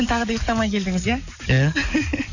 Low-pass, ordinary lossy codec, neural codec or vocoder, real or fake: 7.2 kHz; none; codec, 44.1 kHz, 7.8 kbps, DAC; fake